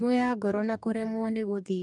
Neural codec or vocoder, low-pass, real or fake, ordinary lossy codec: codec, 44.1 kHz, 2.6 kbps, DAC; 10.8 kHz; fake; none